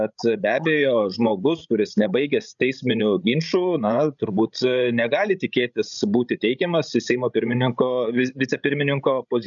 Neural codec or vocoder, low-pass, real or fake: codec, 16 kHz, 16 kbps, FreqCodec, larger model; 7.2 kHz; fake